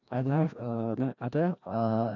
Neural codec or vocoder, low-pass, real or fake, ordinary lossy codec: codec, 16 kHz, 1 kbps, FreqCodec, larger model; 7.2 kHz; fake; none